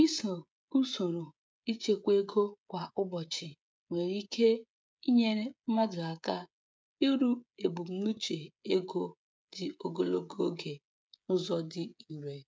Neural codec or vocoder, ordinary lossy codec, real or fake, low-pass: codec, 16 kHz, 16 kbps, FreqCodec, smaller model; none; fake; none